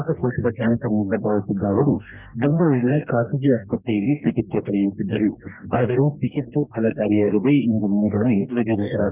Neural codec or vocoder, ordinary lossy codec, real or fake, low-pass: codec, 44.1 kHz, 2.6 kbps, DAC; none; fake; 3.6 kHz